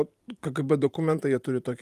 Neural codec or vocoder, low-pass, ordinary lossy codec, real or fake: vocoder, 44.1 kHz, 128 mel bands every 512 samples, BigVGAN v2; 14.4 kHz; Opus, 32 kbps; fake